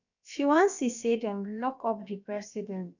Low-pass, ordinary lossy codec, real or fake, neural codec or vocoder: 7.2 kHz; none; fake; codec, 16 kHz, about 1 kbps, DyCAST, with the encoder's durations